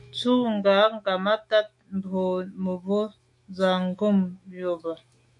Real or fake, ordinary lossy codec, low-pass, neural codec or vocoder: fake; MP3, 48 kbps; 10.8 kHz; autoencoder, 48 kHz, 128 numbers a frame, DAC-VAE, trained on Japanese speech